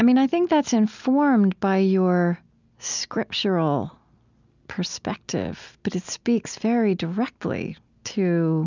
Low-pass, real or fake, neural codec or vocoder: 7.2 kHz; real; none